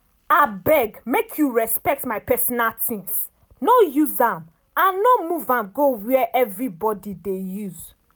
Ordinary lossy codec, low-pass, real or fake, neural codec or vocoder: none; none; real; none